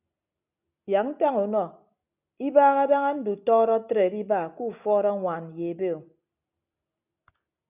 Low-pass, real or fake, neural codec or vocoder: 3.6 kHz; real; none